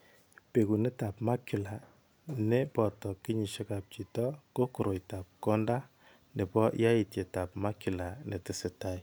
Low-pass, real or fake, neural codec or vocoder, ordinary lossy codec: none; real; none; none